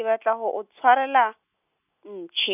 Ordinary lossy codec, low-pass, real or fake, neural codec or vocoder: none; 3.6 kHz; real; none